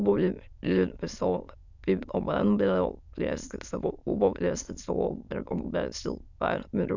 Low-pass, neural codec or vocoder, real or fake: 7.2 kHz; autoencoder, 22.05 kHz, a latent of 192 numbers a frame, VITS, trained on many speakers; fake